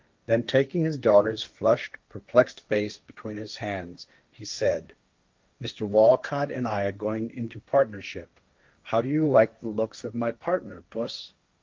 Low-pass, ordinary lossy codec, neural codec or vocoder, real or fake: 7.2 kHz; Opus, 24 kbps; codec, 16 kHz, 1.1 kbps, Voila-Tokenizer; fake